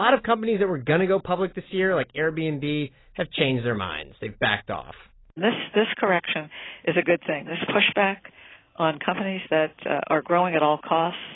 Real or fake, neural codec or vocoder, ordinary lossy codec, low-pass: real; none; AAC, 16 kbps; 7.2 kHz